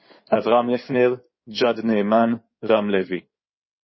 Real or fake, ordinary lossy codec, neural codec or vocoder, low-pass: fake; MP3, 24 kbps; codec, 16 kHz in and 24 kHz out, 2.2 kbps, FireRedTTS-2 codec; 7.2 kHz